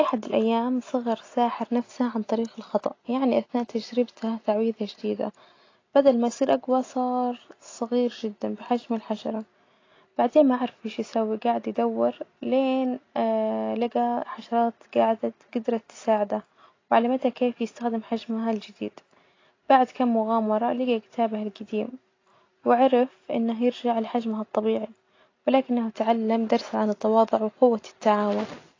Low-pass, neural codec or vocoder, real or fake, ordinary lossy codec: 7.2 kHz; none; real; AAC, 32 kbps